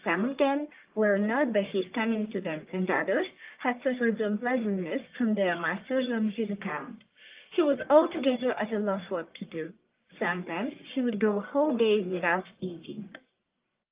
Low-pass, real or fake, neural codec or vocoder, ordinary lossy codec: 3.6 kHz; fake; codec, 44.1 kHz, 1.7 kbps, Pupu-Codec; Opus, 32 kbps